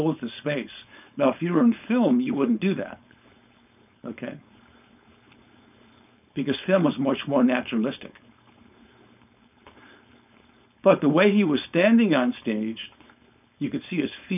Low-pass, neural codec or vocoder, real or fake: 3.6 kHz; codec, 16 kHz, 4.8 kbps, FACodec; fake